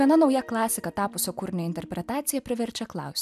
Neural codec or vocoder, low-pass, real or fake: none; 14.4 kHz; real